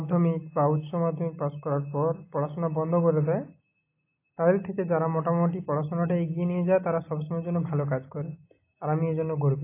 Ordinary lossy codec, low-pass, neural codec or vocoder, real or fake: AAC, 24 kbps; 3.6 kHz; none; real